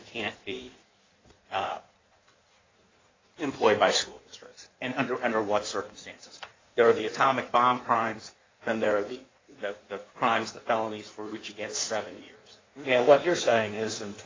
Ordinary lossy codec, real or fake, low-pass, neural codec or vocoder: AAC, 32 kbps; fake; 7.2 kHz; codec, 16 kHz in and 24 kHz out, 1.1 kbps, FireRedTTS-2 codec